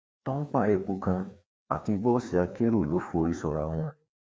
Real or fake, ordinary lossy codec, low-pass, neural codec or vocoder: fake; none; none; codec, 16 kHz, 2 kbps, FreqCodec, larger model